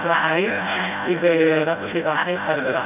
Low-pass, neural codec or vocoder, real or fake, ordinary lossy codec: 3.6 kHz; codec, 16 kHz, 0.5 kbps, FreqCodec, smaller model; fake; none